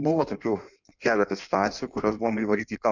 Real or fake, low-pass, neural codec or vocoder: fake; 7.2 kHz; codec, 16 kHz in and 24 kHz out, 1.1 kbps, FireRedTTS-2 codec